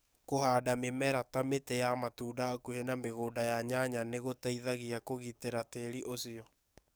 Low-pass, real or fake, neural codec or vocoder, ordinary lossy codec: none; fake; codec, 44.1 kHz, 7.8 kbps, DAC; none